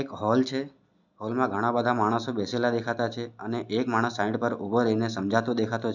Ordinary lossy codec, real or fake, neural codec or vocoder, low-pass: none; real; none; 7.2 kHz